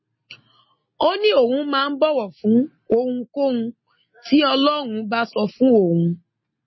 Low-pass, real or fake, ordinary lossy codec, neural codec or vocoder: 7.2 kHz; real; MP3, 24 kbps; none